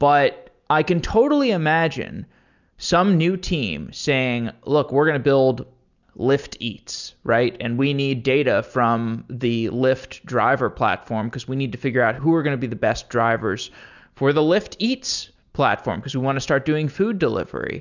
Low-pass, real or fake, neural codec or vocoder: 7.2 kHz; real; none